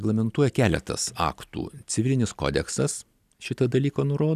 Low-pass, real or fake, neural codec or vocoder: 14.4 kHz; real; none